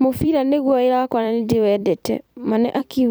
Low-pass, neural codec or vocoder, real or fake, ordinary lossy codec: none; vocoder, 44.1 kHz, 128 mel bands every 256 samples, BigVGAN v2; fake; none